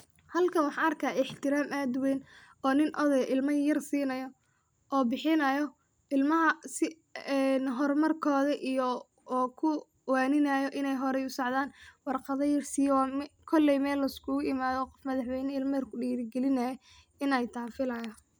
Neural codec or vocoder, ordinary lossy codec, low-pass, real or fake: none; none; none; real